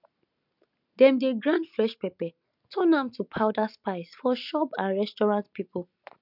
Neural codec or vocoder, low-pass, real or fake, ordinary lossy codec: none; 5.4 kHz; real; none